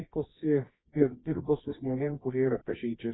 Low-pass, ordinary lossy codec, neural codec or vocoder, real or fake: 7.2 kHz; AAC, 16 kbps; codec, 24 kHz, 0.9 kbps, WavTokenizer, medium music audio release; fake